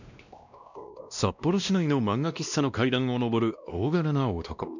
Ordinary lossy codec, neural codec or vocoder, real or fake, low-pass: none; codec, 16 kHz, 1 kbps, X-Codec, WavLM features, trained on Multilingual LibriSpeech; fake; 7.2 kHz